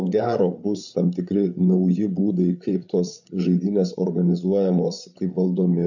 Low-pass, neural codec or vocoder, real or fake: 7.2 kHz; codec, 16 kHz, 8 kbps, FreqCodec, larger model; fake